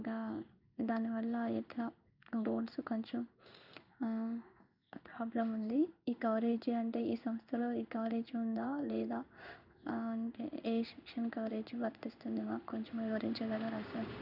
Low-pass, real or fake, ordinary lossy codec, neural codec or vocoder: 5.4 kHz; fake; none; codec, 16 kHz in and 24 kHz out, 1 kbps, XY-Tokenizer